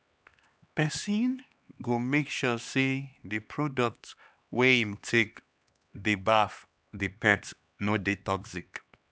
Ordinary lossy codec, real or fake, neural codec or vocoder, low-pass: none; fake; codec, 16 kHz, 2 kbps, X-Codec, HuBERT features, trained on LibriSpeech; none